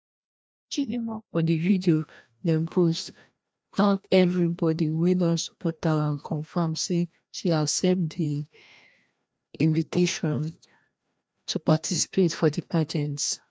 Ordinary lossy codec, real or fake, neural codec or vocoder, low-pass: none; fake; codec, 16 kHz, 1 kbps, FreqCodec, larger model; none